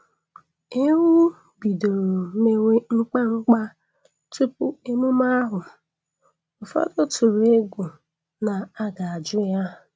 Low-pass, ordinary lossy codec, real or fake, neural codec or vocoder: none; none; real; none